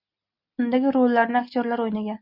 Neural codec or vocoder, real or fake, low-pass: none; real; 5.4 kHz